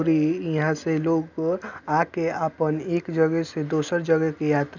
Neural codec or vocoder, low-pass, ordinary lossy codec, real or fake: none; 7.2 kHz; none; real